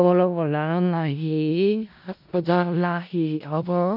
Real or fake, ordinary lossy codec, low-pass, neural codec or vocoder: fake; none; 5.4 kHz; codec, 16 kHz in and 24 kHz out, 0.4 kbps, LongCat-Audio-Codec, four codebook decoder